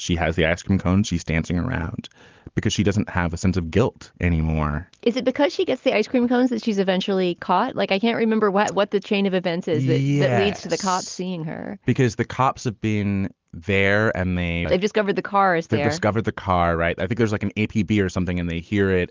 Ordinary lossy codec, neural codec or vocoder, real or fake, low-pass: Opus, 32 kbps; none; real; 7.2 kHz